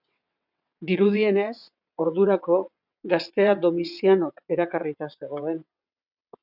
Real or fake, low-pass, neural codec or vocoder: fake; 5.4 kHz; vocoder, 22.05 kHz, 80 mel bands, WaveNeXt